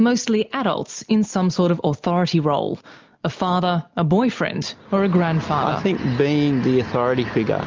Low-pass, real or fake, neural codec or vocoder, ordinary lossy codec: 7.2 kHz; real; none; Opus, 24 kbps